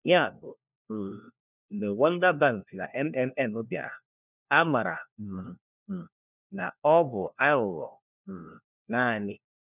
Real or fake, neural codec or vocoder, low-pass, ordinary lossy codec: fake; codec, 16 kHz, 1 kbps, FunCodec, trained on LibriTTS, 50 frames a second; 3.6 kHz; none